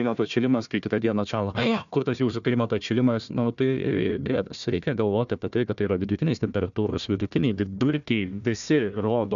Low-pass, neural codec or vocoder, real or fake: 7.2 kHz; codec, 16 kHz, 1 kbps, FunCodec, trained on Chinese and English, 50 frames a second; fake